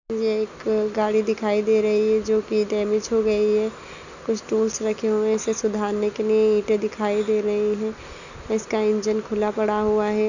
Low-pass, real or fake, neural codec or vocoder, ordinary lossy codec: 7.2 kHz; real; none; none